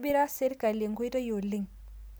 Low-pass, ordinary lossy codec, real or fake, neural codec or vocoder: none; none; real; none